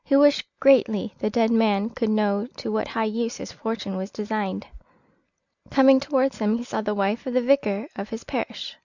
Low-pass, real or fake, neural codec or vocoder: 7.2 kHz; real; none